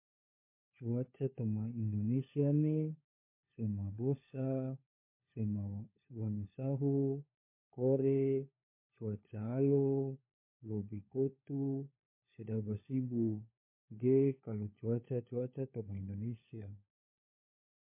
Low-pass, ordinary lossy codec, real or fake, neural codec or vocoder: 3.6 kHz; MP3, 24 kbps; fake; codec, 16 kHz, 4 kbps, FunCodec, trained on LibriTTS, 50 frames a second